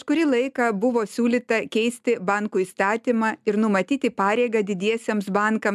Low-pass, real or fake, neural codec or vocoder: 14.4 kHz; real; none